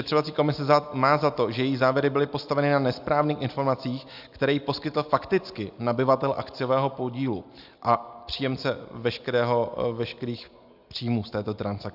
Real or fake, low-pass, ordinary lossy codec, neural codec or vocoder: real; 5.4 kHz; AAC, 48 kbps; none